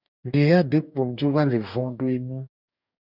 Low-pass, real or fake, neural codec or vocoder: 5.4 kHz; fake; codec, 44.1 kHz, 2.6 kbps, DAC